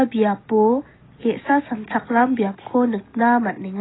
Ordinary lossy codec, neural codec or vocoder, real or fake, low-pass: AAC, 16 kbps; none; real; 7.2 kHz